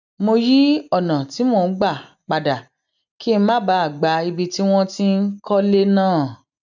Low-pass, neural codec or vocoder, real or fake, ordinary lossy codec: 7.2 kHz; none; real; none